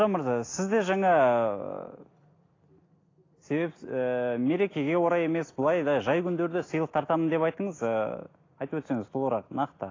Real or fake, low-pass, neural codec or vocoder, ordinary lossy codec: real; 7.2 kHz; none; AAC, 32 kbps